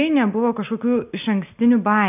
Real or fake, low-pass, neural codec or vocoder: real; 3.6 kHz; none